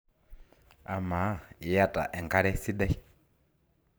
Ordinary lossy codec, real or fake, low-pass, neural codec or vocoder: none; fake; none; vocoder, 44.1 kHz, 128 mel bands every 512 samples, BigVGAN v2